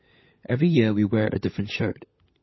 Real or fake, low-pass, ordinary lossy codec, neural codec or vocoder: fake; 7.2 kHz; MP3, 24 kbps; codec, 16 kHz, 16 kbps, FunCodec, trained on LibriTTS, 50 frames a second